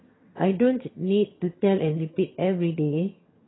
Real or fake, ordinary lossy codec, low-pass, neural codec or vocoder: fake; AAC, 16 kbps; 7.2 kHz; vocoder, 22.05 kHz, 80 mel bands, HiFi-GAN